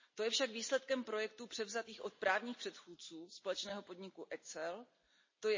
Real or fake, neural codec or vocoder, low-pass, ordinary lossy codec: real; none; 7.2 kHz; MP3, 32 kbps